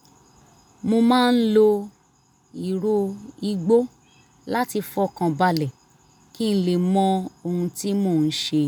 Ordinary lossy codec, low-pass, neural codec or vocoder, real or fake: none; none; none; real